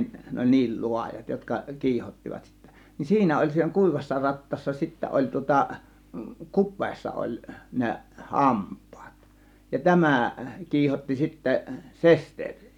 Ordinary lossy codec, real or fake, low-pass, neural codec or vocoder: none; real; 19.8 kHz; none